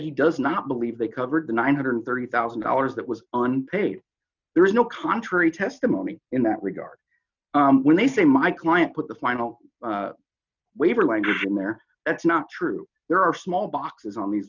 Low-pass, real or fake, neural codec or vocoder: 7.2 kHz; real; none